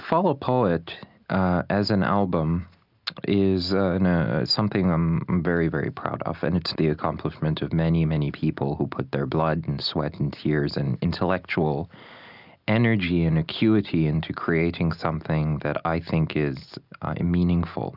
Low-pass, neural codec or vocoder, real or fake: 5.4 kHz; none; real